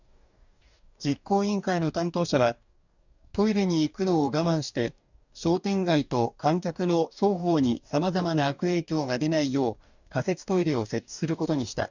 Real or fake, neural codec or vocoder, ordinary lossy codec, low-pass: fake; codec, 44.1 kHz, 2.6 kbps, DAC; none; 7.2 kHz